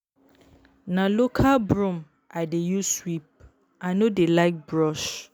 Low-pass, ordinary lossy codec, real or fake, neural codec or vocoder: none; none; real; none